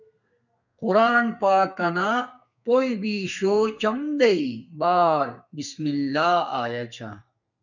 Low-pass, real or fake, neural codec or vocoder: 7.2 kHz; fake; codec, 32 kHz, 1.9 kbps, SNAC